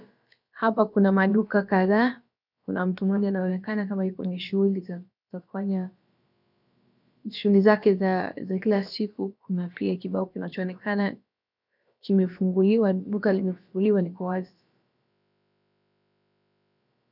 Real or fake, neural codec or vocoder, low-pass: fake; codec, 16 kHz, about 1 kbps, DyCAST, with the encoder's durations; 5.4 kHz